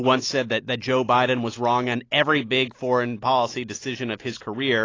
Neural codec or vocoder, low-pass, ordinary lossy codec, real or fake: none; 7.2 kHz; AAC, 32 kbps; real